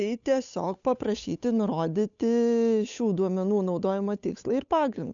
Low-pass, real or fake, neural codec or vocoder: 7.2 kHz; fake; codec, 16 kHz, 8 kbps, FunCodec, trained on Chinese and English, 25 frames a second